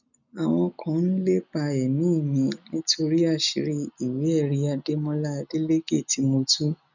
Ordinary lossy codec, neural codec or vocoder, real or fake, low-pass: none; none; real; 7.2 kHz